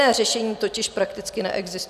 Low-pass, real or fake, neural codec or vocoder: 14.4 kHz; real; none